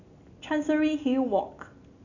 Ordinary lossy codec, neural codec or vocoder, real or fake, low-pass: none; none; real; 7.2 kHz